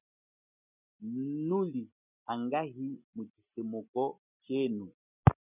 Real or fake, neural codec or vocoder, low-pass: real; none; 3.6 kHz